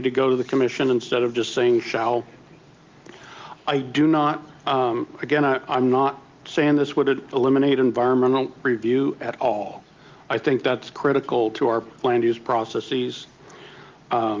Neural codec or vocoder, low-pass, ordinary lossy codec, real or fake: none; 7.2 kHz; Opus, 32 kbps; real